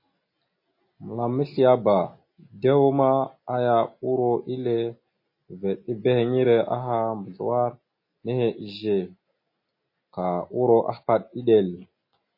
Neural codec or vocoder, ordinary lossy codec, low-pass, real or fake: none; MP3, 24 kbps; 5.4 kHz; real